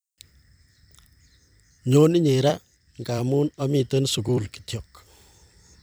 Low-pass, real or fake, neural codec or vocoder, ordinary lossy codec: none; fake; vocoder, 44.1 kHz, 128 mel bands every 256 samples, BigVGAN v2; none